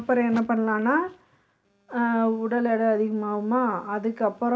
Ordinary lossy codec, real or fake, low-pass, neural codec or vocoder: none; real; none; none